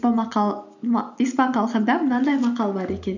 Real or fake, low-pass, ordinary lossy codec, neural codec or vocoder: real; 7.2 kHz; none; none